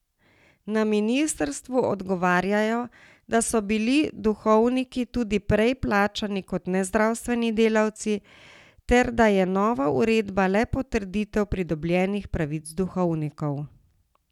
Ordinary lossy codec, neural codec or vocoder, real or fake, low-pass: none; none; real; 19.8 kHz